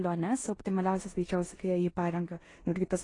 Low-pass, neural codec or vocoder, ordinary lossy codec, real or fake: 10.8 kHz; codec, 16 kHz in and 24 kHz out, 0.9 kbps, LongCat-Audio-Codec, four codebook decoder; AAC, 32 kbps; fake